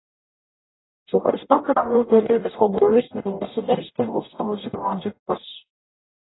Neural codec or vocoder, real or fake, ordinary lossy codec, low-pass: codec, 44.1 kHz, 0.9 kbps, DAC; fake; AAC, 16 kbps; 7.2 kHz